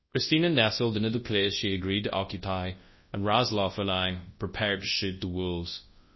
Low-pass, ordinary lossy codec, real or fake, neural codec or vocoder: 7.2 kHz; MP3, 24 kbps; fake; codec, 24 kHz, 0.9 kbps, WavTokenizer, large speech release